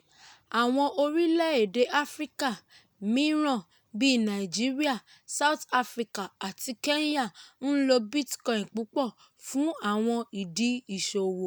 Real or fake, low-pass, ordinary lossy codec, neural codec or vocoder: real; none; none; none